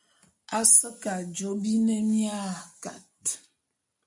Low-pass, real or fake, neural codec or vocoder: 10.8 kHz; real; none